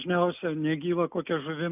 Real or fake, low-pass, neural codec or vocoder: real; 3.6 kHz; none